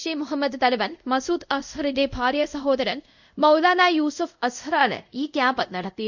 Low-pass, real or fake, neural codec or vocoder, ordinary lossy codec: 7.2 kHz; fake; codec, 24 kHz, 0.5 kbps, DualCodec; none